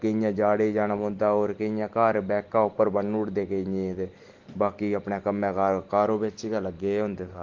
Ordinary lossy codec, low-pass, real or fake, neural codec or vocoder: Opus, 16 kbps; 7.2 kHz; real; none